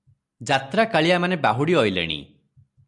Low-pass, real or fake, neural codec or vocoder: 10.8 kHz; real; none